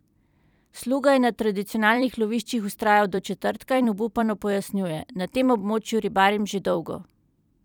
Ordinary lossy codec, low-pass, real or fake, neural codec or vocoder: none; 19.8 kHz; fake; vocoder, 44.1 kHz, 128 mel bands every 256 samples, BigVGAN v2